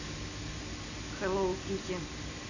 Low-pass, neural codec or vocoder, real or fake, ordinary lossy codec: 7.2 kHz; none; real; none